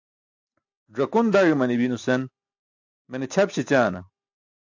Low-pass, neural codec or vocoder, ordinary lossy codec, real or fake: 7.2 kHz; none; AAC, 48 kbps; real